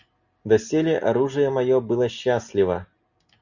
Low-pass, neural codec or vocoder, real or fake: 7.2 kHz; none; real